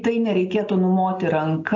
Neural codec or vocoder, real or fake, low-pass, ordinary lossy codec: none; real; 7.2 kHz; AAC, 48 kbps